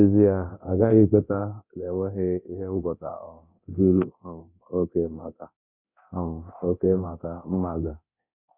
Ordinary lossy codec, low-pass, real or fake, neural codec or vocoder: none; 3.6 kHz; fake; codec, 24 kHz, 0.9 kbps, DualCodec